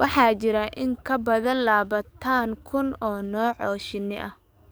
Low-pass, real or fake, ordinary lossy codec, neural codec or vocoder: none; fake; none; codec, 44.1 kHz, 7.8 kbps, DAC